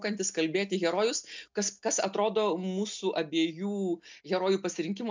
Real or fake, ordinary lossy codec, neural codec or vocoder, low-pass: real; MP3, 64 kbps; none; 7.2 kHz